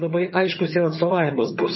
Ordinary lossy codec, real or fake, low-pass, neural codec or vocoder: MP3, 24 kbps; fake; 7.2 kHz; vocoder, 22.05 kHz, 80 mel bands, HiFi-GAN